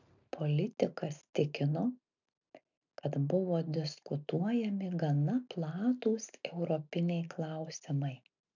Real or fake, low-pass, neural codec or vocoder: real; 7.2 kHz; none